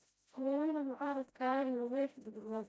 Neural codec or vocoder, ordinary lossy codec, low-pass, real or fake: codec, 16 kHz, 0.5 kbps, FreqCodec, smaller model; none; none; fake